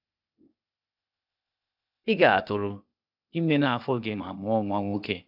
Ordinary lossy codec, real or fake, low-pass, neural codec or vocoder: AAC, 48 kbps; fake; 5.4 kHz; codec, 16 kHz, 0.8 kbps, ZipCodec